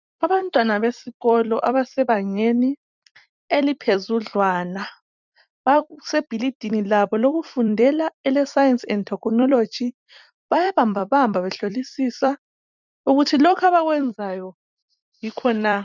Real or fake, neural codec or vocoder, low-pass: fake; vocoder, 44.1 kHz, 128 mel bands every 256 samples, BigVGAN v2; 7.2 kHz